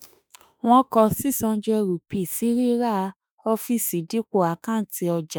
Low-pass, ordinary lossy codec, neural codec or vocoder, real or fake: none; none; autoencoder, 48 kHz, 32 numbers a frame, DAC-VAE, trained on Japanese speech; fake